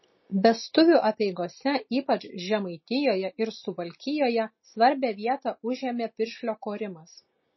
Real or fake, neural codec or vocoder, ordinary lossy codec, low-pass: real; none; MP3, 24 kbps; 7.2 kHz